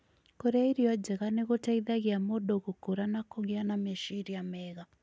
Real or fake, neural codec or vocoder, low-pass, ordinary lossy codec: real; none; none; none